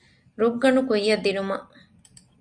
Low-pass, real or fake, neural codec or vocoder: 9.9 kHz; real; none